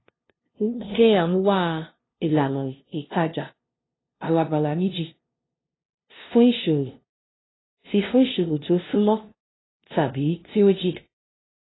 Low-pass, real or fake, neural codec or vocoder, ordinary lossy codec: 7.2 kHz; fake; codec, 16 kHz, 0.5 kbps, FunCodec, trained on LibriTTS, 25 frames a second; AAC, 16 kbps